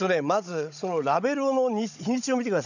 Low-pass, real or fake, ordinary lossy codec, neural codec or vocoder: 7.2 kHz; fake; none; codec, 16 kHz, 16 kbps, FunCodec, trained on Chinese and English, 50 frames a second